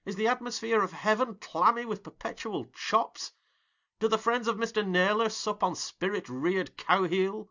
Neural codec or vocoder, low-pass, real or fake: none; 7.2 kHz; real